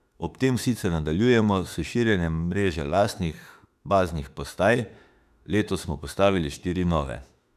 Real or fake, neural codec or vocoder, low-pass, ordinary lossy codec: fake; autoencoder, 48 kHz, 32 numbers a frame, DAC-VAE, trained on Japanese speech; 14.4 kHz; none